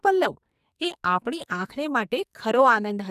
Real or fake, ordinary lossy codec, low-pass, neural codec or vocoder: fake; none; 14.4 kHz; codec, 44.1 kHz, 2.6 kbps, SNAC